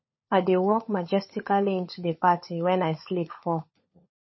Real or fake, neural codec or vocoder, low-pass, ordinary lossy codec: fake; codec, 16 kHz, 16 kbps, FunCodec, trained on LibriTTS, 50 frames a second; 7.2 kHz; MP3, 24 kbps